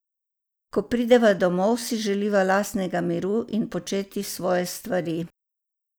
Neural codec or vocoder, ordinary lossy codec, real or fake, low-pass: none; none; real; none